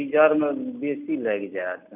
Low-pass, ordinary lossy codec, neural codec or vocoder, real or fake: 3.6 kHz; none; none; real